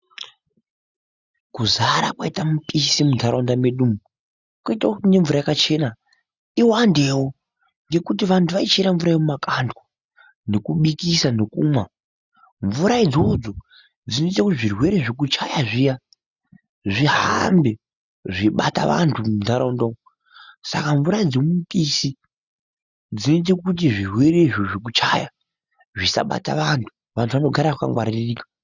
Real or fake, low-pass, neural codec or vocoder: real; 7.2 kHz; none